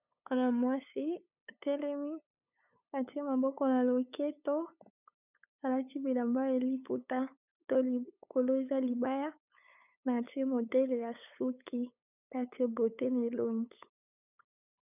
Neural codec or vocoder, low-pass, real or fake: codec, 16 kHz, 8 kbps, FunCodec, trained on LibriTTS, 25 frames a second; 3.6 kHz; fake